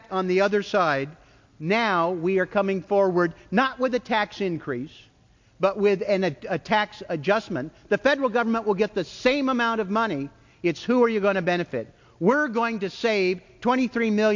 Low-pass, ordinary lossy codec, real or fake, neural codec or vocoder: 7.2 kHz; MP3, 48 kbps; real; none